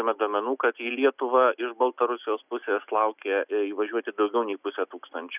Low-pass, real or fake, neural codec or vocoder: 3.6 kHz; real; none